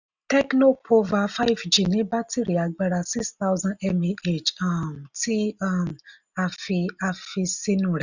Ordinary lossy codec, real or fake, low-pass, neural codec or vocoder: none; real; 7.2 kHz; none